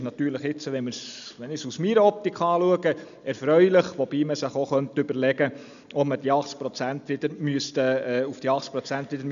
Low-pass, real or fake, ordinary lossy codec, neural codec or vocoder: 7.2 kHz; real; none; none